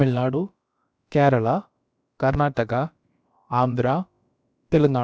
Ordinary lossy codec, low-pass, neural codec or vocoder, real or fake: none; none; codec, 16 kHz, about 1 kbps, DyCAST, with the encoder's durations; fake